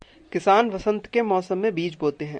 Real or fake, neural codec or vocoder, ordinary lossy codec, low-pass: real; none; Opus, 64 kbps; 9.9 kHz